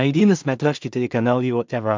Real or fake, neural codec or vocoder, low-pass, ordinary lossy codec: fake; codec, 16 kHz in and 24 kHz out, 0.4 kbps, LongCat-Audio-Codec, two codebook decoder; 7.2 kHz; MP3, 64 kbps